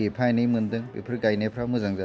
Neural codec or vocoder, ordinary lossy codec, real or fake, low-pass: none; none; real; none